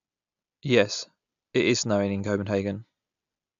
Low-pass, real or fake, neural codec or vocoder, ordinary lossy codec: 7.2 kHz; real; none; AAC, 96 kbps